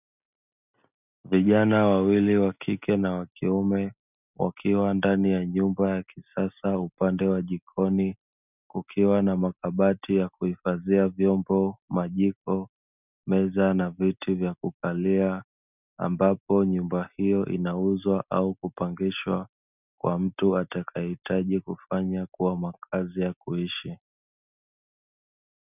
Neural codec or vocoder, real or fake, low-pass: none; real; 3.6 kHz